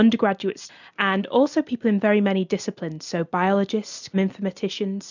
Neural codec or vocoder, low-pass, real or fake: none; 7.2 kHz; real